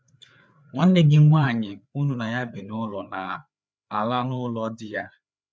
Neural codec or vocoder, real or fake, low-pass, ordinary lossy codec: codec, 16 kHz, 4 kbps, FreqCodec, larger model; fake; none; none